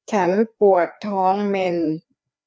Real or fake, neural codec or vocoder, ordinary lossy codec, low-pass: fake; codec, 16 kHz, 2 kbps, FreqCodec, larger model; none; none